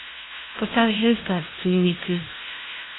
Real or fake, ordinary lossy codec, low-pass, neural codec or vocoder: fake; AAC, 16 kbps; 7.2 kHz; codec, 16 kHz, 0.5 kbps, FunCodec, trained on LibriTTS, 25 frames a second